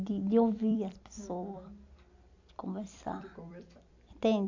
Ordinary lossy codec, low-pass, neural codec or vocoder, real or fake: AAC, 48 kbps; 7.2 kHz; vocoder, 44.1 kHz, 128 mel bands every 256 samples, BigVGAN v2; fake